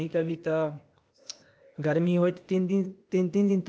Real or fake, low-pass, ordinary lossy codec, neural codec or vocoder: fake; none; none; codec, 16 kHz, 0.8 kbps, ZipCodec